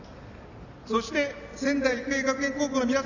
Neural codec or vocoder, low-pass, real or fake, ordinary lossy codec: none; 7.2 kHz; real; none